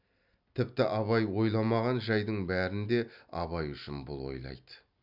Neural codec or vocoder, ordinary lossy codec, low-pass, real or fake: none; none; 5.4 kHz; real